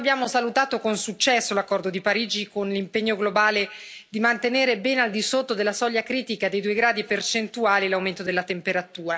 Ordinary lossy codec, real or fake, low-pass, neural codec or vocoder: none; real; none; none